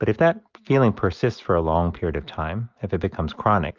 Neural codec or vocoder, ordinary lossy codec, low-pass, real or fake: none; Opus, 24 kbps; 7.2 kHz; real